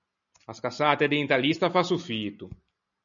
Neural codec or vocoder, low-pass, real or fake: none; 7.2 kHz; real